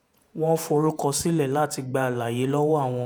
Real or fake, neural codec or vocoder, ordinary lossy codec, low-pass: fake; vocoder, 48 kHz, 128 mel bands, Vocos; none; none